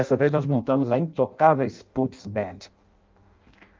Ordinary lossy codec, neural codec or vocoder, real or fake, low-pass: Opus, 32 kbps; codec, 16 kHz in and 24 kHz out, 0.6 kbps, FireRedTTS-2 codec; fake; 7.2 kHz